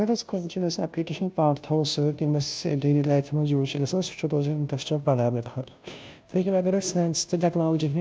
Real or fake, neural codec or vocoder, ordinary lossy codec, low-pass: fake; codec, 16 kHz, 0.5 kbps, FunCodec, trained on Chinese and English, 25 frames a second; none; none